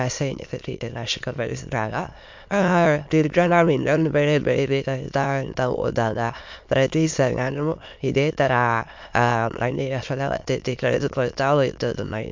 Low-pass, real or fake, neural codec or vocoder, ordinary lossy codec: 7.2 kHz; fake; autoencoder, 22.05 kHz, a latent of 192 numbers a frame, VITS, trained on many speakers; MP3, 64 kbps